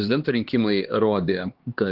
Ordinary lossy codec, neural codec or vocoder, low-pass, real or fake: Opus, 16 kbps; codec, 16 kHz, 2 kbps, X-Codec, HuBERT features, trained on LibriSpeech; 5.4 kHz; fake